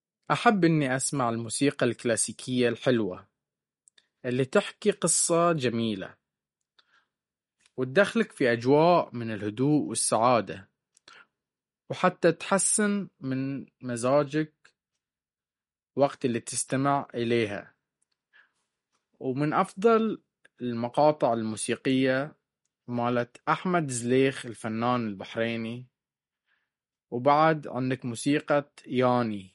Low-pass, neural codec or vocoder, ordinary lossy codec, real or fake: 19.8 kHz; none; MP3, 48 kbps; real